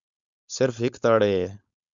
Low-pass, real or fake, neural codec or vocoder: 7.2 kHz; fake; codec, 16 kHz, 4.8 kbps, FACodec